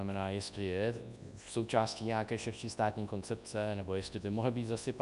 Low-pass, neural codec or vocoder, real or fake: 10.8 kHz; codec, 24 kHz, 0.9 kbps, WavTokenizer, large speech release; fake